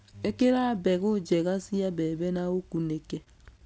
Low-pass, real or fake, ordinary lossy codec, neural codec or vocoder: none; real; none; none